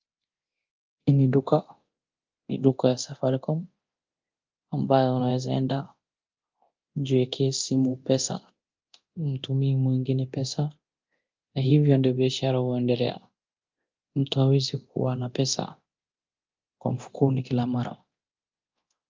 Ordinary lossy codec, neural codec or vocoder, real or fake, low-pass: Opus, 32 kbps; codec, 24 kHz, 0.9 kbps, DualCodec; fake; 7.2 kHz